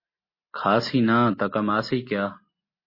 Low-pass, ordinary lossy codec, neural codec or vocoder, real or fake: 5.4 kHz; MP3, 24 kbps; none; real